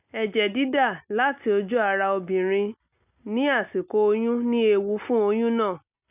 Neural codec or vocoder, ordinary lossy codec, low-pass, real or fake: none; none; 3.6 kHz; real